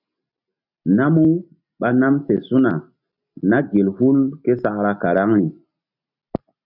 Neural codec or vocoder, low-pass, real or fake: none; 5.4 kHz; real